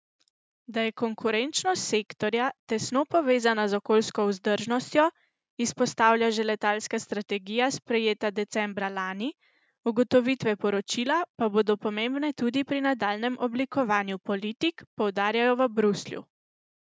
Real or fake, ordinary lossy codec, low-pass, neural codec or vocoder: real; none; none; none